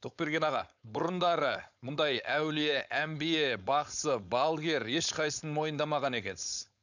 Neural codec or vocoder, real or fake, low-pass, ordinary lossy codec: codec, 16 kHz, 4.8 kbps, FACodec; fake; 7.2 kHz; none